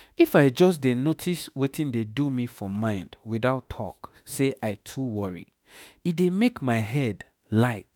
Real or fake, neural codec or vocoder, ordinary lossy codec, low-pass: fake; autoencoder, 48 kHz, 32 numbers a frame, DAC-VAE, trained on Japanese speech; none; none